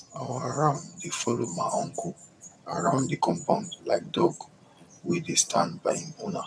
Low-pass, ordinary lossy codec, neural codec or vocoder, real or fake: none; none; vocoder, 22.05 kHz, 80 mel bands, HiFi-GAN; fake